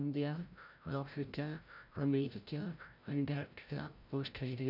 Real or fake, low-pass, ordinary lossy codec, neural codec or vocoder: fake; 5.4 kHz; none; codec, 16 kHz, 0.5 kbps, FreqCodec, larger model